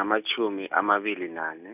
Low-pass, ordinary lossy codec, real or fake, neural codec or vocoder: 3.6 kHz; none; real; none